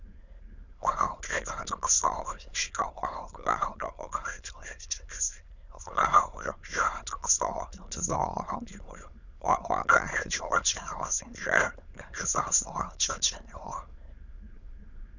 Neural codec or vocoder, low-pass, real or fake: autoencoder, 22.05 kHz, a latent of 192 numbers a frame, VITS, trained on many speakers; 7.2 kHz; fake